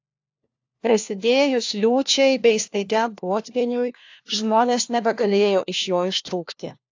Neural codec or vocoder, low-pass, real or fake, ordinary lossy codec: codec, 16 kHz, 1 kbps, FunCodec, trained on LibriTTS, 50 frames a second; 7.2 kHz; fake; AAC, 48 kbps